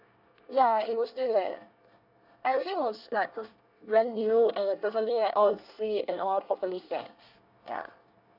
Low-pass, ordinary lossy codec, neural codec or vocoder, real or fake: 5.4 kHz; none; codec, 24 kHz, 1 kbps, SNAC; fake